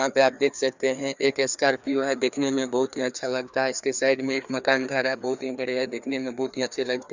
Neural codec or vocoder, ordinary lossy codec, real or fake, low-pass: codec, 16 kHz, 2 kbps, FreqCodec, larger model; Opus, 64 kbps; fake; 7.2 kHz